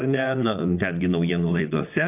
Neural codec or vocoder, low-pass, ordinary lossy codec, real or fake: vocoder, 22.05 kHz, 80 mel bands, WaveNeXt; 3.6 kHz; AAC, 32 kbps; fake